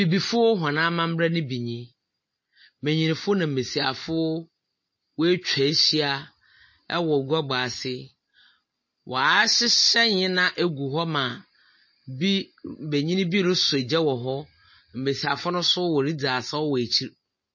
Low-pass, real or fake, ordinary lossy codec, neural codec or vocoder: 7.2 kHz; real; MP3, 32 kbps; none